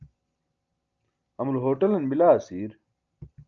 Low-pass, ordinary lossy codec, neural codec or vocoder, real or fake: 7.2 kHz; Opus, 24 kbps; none; real